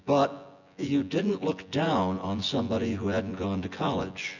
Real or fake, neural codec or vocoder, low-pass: fake; vocoder, 24 kHz, 100 mel bands, Vocos; 7.2 kHz